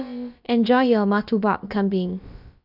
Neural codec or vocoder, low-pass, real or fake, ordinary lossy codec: codec, 16 kHz, about 1 kbps, DyCAST, with the encoder's durations; 5.4 kHz; fake; none